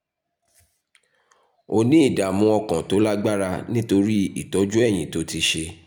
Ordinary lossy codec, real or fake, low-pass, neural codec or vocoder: none; real; none; none